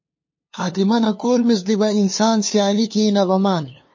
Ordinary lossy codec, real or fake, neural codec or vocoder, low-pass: MP3, 32 kbps; fake; codec, 16 kHz, 2 kbps, FunCodec, trained on LibriTTS, 25 frames a second; 7.2 kHz